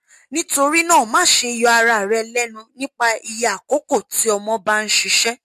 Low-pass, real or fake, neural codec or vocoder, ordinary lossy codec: 14.4 kHz; real; none; MP3, 64 kbps